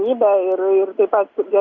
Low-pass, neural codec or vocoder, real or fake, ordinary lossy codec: 7.2 kHz; none; real; Opus, 64 kbps